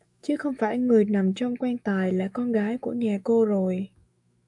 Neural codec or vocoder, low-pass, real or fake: autoencoder, 48 kHz, 128 numbers a frame, DAC-VAE, trained on Japanese speech; 10.8 kHz; fake